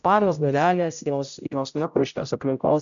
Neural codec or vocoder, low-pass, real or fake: codec, 16 kHz, 0.5 kbps, X-Codec, HuBERT features, trained on general audio; 7.2 kHz; fake